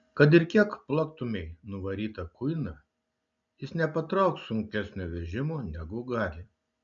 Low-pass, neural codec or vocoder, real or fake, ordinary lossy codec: 7.2 kHz; none; real; MP3, 64 kbps